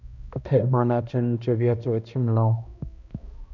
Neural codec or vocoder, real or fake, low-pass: codec, 16 kHz, 1 kbps, X-Codec, HuBERT features, trained on balanced general audio; fake; 7.2 kHz